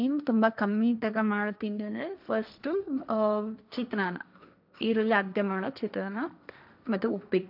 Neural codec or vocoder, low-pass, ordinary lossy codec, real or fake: codec, 16 kHz, 1.1 kbps, Voila-Tokenizer; 5.4 kHz; none; fake